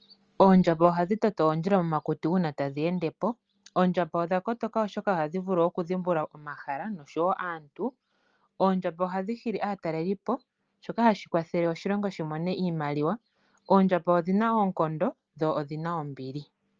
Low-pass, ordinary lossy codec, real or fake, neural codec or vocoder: 7.2 kHz; Opus, 24 kbps; real; none